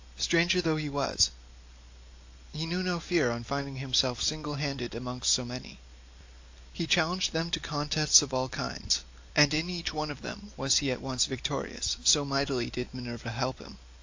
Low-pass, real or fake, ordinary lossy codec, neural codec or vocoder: 7.2 kHz; real; AAC, 48 kbps; none